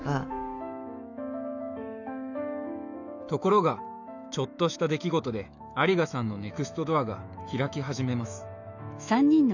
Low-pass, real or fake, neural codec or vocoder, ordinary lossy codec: 7.2 kHz; fake; autoencoder, 48 kHz, 128 numbers a frame, DAC-VAE, trained on Japanese speech; none